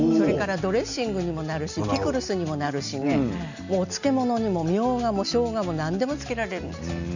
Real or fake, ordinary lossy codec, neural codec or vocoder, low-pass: real; none; none; 7.2 kHz